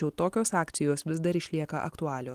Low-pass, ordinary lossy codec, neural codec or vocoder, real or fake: 14.4 kHz; Opus, 24 kbps; none; real